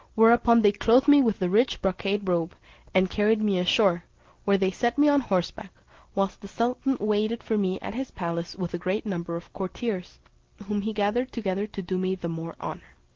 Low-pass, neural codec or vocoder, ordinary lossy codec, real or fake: 7.2 kHz; none; Opus, 16 kbps; real